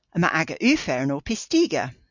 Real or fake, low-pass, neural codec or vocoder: real; 7.2 kHz; none